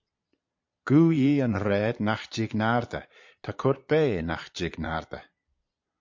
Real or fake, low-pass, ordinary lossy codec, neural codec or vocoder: fake; 7.2 kHz; MP3, 48 kbps; vocoder, 22.05 kHz, 80 mel bands, Vocos